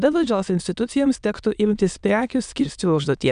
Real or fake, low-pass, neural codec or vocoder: fake; 9.9 kHz; autoencoder, 22.05 kHz, a latent of 192 numbers a frame, VITS, trained on many speakers